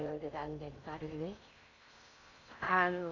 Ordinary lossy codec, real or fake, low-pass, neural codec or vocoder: none; fake; 7.2 kHz; codec, 16 kHz in and 24 kHz out, 0.6 kbps, FocalCodec, streaming, 4096 codes